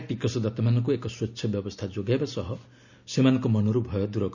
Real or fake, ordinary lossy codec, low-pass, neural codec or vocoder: real; none; 7.2 kHz; none